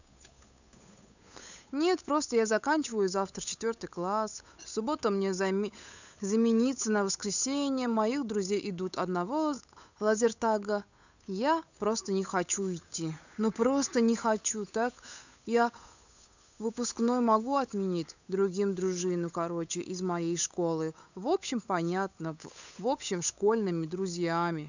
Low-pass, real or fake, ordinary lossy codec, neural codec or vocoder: 7.2 kHz; fake; none; codec, 16 kHz, 8 kbps, FunCodec, trained on Chinese and English, 25 frames a second